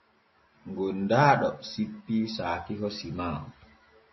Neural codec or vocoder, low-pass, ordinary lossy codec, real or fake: none; 7.2 kHz; MP3, 24 kbps; real